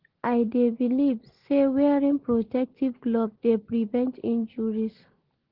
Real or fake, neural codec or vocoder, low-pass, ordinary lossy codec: real; none; 5.4 kHz; Opus, 16 kbps